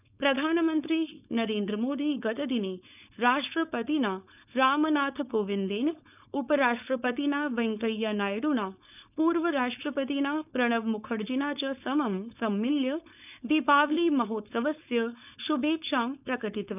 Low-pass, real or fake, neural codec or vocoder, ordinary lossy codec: 3.6 kHz; fake; codec, 16 kHz, 4.8 kbps, FACodec; none